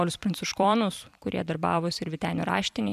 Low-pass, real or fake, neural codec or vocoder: 14.4 kHz; fake; vocoder, 44.1 kHz, 128 mel bands every 256 samples, BigVGAN v2